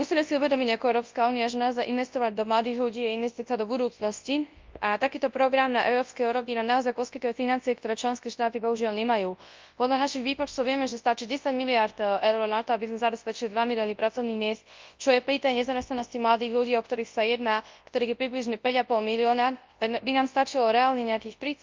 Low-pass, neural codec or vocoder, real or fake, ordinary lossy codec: 7.2 kHz; codec, 24 kHz, 0.9 kbps, WavTokenizer, large speech release; fake; Opus, 24 kbps